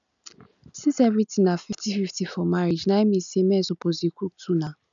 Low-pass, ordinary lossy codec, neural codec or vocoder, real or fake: 7.2 kHz; none; none; real